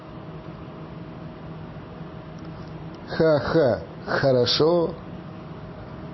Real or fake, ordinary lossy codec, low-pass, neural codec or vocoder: real; MP3, 24 kbps; 7.2 kHz; none